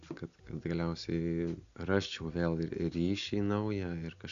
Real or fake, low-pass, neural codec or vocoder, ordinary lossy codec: real; 7.2 kHz; none; Opus, 64 kbps